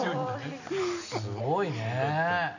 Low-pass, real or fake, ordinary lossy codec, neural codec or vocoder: 7.2 kHz; real; none; none